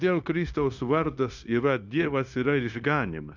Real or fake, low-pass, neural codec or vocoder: fake; 7.2 kHz; codec, 16 kHz, 0.9 kbps, LongCat-Audio-Codec